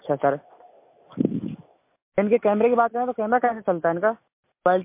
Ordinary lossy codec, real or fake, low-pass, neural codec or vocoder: MP3, 24 kbps; real; 3.6 kHz; none